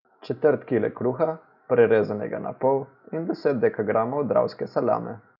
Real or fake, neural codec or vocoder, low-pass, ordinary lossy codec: real; none; 5.4 kHz; none